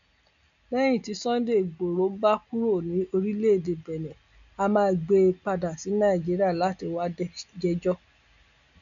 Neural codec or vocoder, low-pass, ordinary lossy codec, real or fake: none; 7.2 kHz; none; real